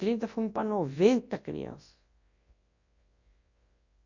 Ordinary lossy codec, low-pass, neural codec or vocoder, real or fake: Opus, 64 kbps; 7.2 kHz; codec, 24 kHz, 0.9 kbps, WavTokenizer, large speech release; fake